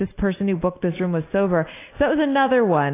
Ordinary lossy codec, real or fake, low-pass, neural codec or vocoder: AAC, 24 kbps; real; 3.6 kHz; none